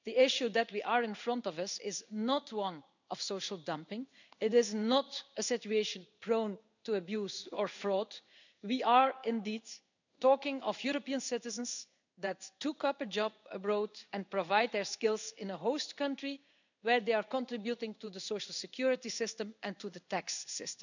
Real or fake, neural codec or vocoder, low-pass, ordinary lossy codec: fake; codec, 16 kHz in and 24 kHz out, 1 kbps, XY-Tokenizer; 7.2 kHz; none